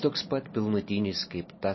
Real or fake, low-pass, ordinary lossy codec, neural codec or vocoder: real; 7.2 kHz; MP3, 24 kbps; none